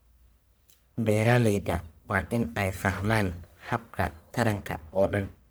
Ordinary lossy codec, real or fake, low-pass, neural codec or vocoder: none; fake; none; codec, 44.1 kHz, 1.7 kbps, Pupu-Codec